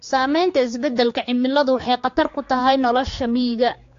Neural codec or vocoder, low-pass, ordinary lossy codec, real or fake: codec, 16 kHz, 4 kbps, X-Codec, HuBERT features, trained on general audio; 7.2 kHz; AAC, 48 kbps; fake